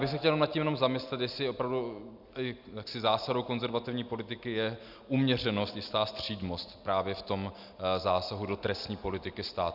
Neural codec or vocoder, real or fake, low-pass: none; real; 5.4 kHz